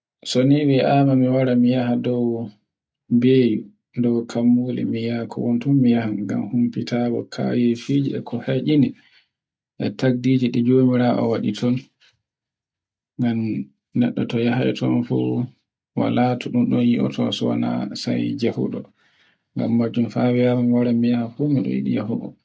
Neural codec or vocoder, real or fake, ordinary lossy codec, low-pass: none; real; none; none